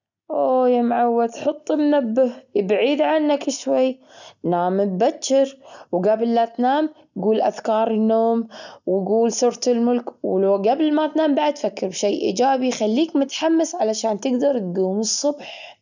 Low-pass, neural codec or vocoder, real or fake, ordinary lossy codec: 7.2 kHz; none; real; none